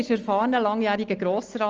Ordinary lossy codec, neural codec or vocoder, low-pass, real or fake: Opus, 24 kbps; none; 7.2 kHz; real